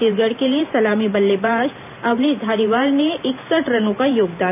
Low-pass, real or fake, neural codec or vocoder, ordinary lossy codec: 3.6 kHz; fake; vocoder, 44.1 kHz, 128 mel bands every 512 samples, BigVGAN v2; none